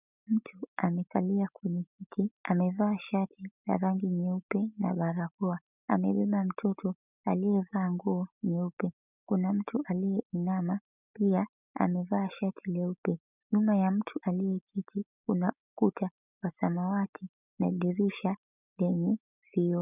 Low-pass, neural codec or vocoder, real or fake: 3.6 kHz; none; real